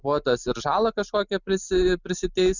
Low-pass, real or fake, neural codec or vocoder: 7.2 kHz; real; none